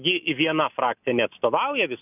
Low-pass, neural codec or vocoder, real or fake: 3.6 kHz; none; real